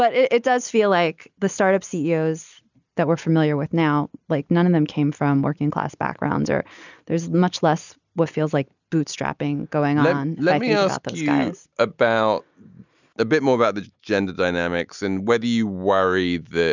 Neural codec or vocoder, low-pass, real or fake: none; 7.2 kHz; real